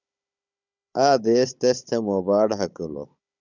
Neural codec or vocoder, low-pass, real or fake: codec, 16 kHz, 16 kbps, FunCodec, trained on Chinese and English, 50 frames a second; 7.2 kHz; fake